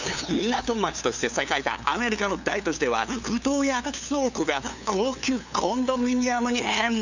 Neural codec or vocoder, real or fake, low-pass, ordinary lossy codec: codec, 16 kHz, 2 kbps, FunCodec, trained on LibriTTS, 25 frames a second; fake; 7.2 kHz; none